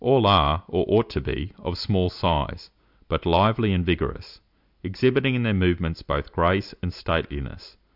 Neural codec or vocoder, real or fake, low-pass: none; real; 5.4 kHz